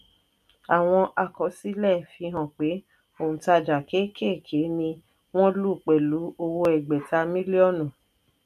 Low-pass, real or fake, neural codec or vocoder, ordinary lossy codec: 14.4 kHz; real; none; none